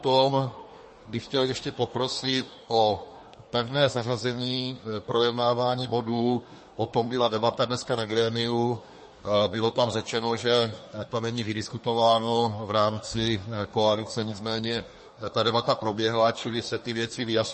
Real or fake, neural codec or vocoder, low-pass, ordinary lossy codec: fake; codec, 24 kHz, 1 kbps, SNAC; 10.8 kHz; MP3, 32 kbps